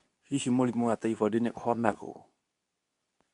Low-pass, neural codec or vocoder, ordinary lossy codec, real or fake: 10.8 kHz; codec, 24 kHz, 0.9 kbps, WavTokenizer, medium speech release version 2; none; fake